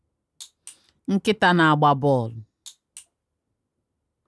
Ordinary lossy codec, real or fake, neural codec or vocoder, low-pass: none; real; none; none